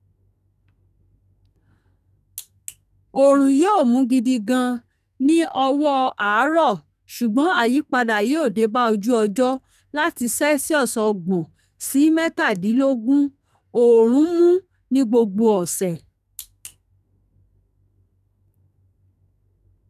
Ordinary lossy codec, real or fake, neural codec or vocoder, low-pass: none; fake; codec, 44.1 kHz, 2.6 kbps, SNAC; 14.4 kHz